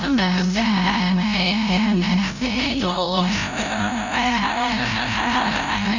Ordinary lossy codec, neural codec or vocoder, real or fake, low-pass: none; codec, 16 kHz, 0.5 kbps, FreqCodec, larger model; fake; 7.2 kHz